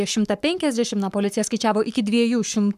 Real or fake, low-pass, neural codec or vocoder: fake; 14.4 kHz; codec, 44.1 kHz, 7.8 kbps, Pupu-Codec